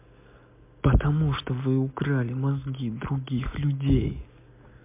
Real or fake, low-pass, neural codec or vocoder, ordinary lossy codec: real; 3.6 kHz; none; MP3, 32 kbps